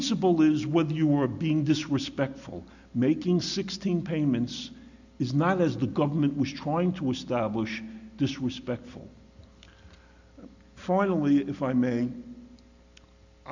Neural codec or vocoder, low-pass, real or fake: none; 7.2 kHz; real